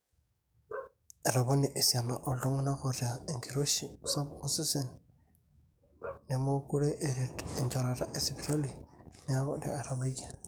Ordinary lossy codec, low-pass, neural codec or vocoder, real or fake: none; none; codec, 44.1 kHz, 7.8 kbps, DAC; fake